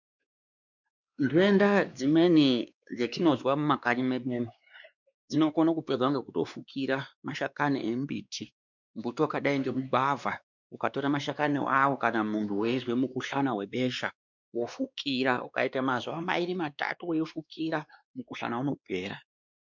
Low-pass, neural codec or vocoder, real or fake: 7.2 kHz; codec, 16 kHz, 2 kbps, X-Codec, WavLM features, trained on Multilingual LibriSpeech; fake